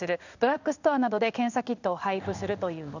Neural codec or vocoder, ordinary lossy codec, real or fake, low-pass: codec, 16 kHz in and 24 kHz out, 1 kbps, XY-Tokenizer; none; fake; 7.2 kHz